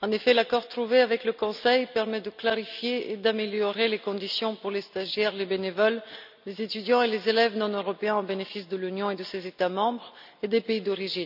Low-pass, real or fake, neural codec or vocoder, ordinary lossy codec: 5.4 kHz; real; none; none